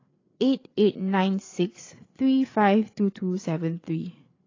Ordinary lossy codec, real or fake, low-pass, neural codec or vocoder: AAC, 32 kbps; fake; 7.2 kHz; codec, 16 kHz, 8 kbps, FreqCodec, larger model